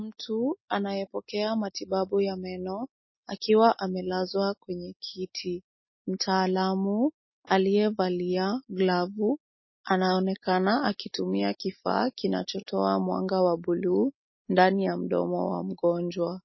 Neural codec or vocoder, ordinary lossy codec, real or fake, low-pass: none; MP3, 24 kbps; real; 7.2 kHz